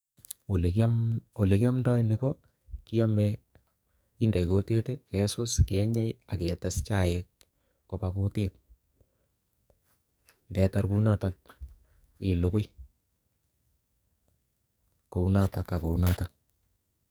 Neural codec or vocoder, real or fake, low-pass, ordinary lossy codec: codec, 44.1 kHz, 2.6 kbps, SNAC; fake; none; none